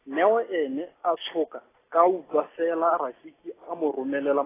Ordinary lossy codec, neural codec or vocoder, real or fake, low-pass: AAC, 16 kbps; none; real; 3.6 kHz